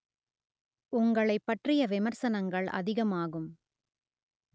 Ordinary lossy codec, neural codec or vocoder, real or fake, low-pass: none; none; real; none